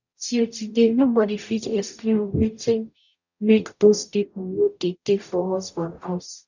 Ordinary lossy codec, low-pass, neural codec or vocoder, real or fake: none; 7.2 kHz; codec, 44.1 kHz, 0.9 kbps, DAC; fake